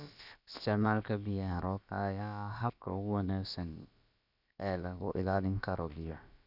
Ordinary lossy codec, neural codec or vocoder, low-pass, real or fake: none; codec, 16 kHz, about 1 kbps, DyCAST, with the encoder's durations; 5.4 kHz; fake